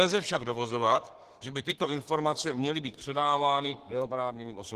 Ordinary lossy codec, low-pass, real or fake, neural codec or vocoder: Opus, 16 kbps; 14.4 kHz; fake; codec, 32 kHz, 1.9 kbps, SNAC